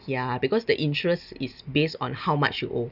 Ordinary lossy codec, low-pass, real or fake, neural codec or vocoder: none; 5.4 kHz; real; none